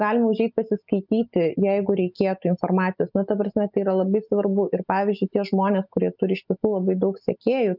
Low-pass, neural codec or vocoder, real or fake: 5.4 kHz; none; real